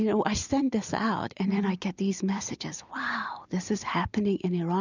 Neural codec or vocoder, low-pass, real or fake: vocoder, 44.1 kHz, 128 mel bands every 256 samples, BigVGAN v2; 7.2 kHz; fake